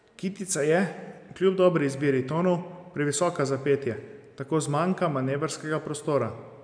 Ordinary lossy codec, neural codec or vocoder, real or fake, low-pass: none; none; real; 9.9 kHz